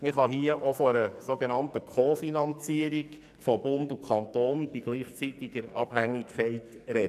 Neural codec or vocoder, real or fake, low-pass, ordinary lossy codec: codec, 44.1 kHz, 2.6 kbps, SNAC; fake; 14.4 kHz; none